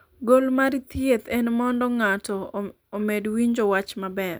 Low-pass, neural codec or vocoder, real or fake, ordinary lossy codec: none; none; real; none